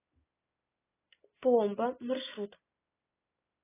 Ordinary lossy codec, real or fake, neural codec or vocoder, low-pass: AAC, 16 kbps; real; none; 3.6 kHz